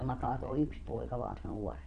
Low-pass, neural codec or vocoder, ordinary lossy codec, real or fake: 9.9 kHz; vocoder, 22.05 kHz, 80 mel bands, Vocos; none; fake